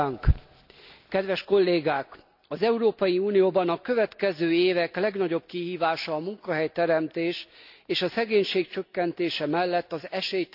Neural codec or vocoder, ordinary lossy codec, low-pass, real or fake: none; none; 5.4 kHz; real